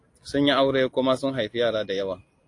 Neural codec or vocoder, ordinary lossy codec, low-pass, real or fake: none; AAC, 48 kbps; 10.8 kHz; real